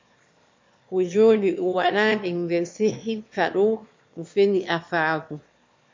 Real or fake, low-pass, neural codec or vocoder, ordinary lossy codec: fake; 7.2 kHz; autoencoder, 22.05 kHz, a latent of 192 numbers a frame, VITS, trained on one speaker; MP3, 48 kbps